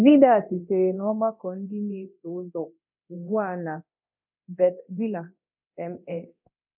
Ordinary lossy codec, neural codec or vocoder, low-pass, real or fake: none; codec, 24 kHz, 0.9 kbps, DualCodec; 3.6 kHz; fake